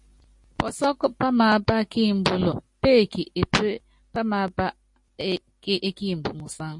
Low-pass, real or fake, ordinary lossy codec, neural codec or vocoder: 10.8 kHz; real; MP3, 48 kbps; none